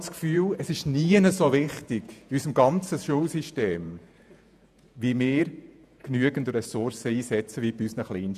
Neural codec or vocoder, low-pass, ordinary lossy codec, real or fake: vocoder, 48 kHz, 128 mel bands, Vocos; 14.4 kHz; none; fake